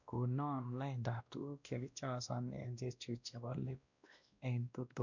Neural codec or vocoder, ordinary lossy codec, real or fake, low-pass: codec, 16 kHz, 1 kbps, X-Codec, WavLM features, trained on Multilingual LibriSpeech; none; fake; 7.2 kHz